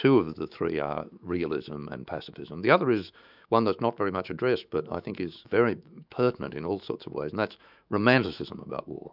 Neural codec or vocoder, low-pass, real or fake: autoencoder, 48 kHz, 128 numbers a frame, DAC-VAE, trained on Japanese speech; 5.4 kHz; fake